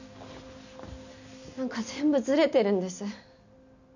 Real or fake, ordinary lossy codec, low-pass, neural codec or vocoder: real; none; 7.2 kHz; none